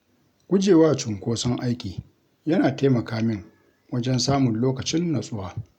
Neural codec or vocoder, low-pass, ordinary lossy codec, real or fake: none; 19.8 kHz; none; real